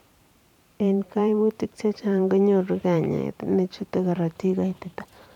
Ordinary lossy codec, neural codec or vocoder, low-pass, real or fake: none; vocoder, 44.1 kHz, 128 mel bands every 512 samples, BigVGAN v2; 19.8 kHz; fake